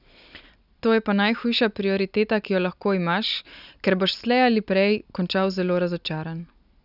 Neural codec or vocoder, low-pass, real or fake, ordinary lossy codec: none; 5.4 kHz; real; none